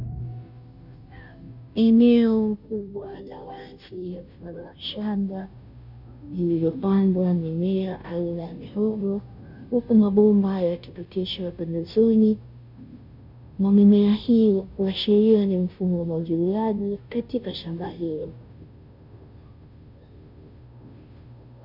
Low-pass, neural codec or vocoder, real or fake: 5.4 kHz; codec, 16 kHz, 0.5 kbps, FunCodec, trained on Chinese and English, 25 frames a second; fake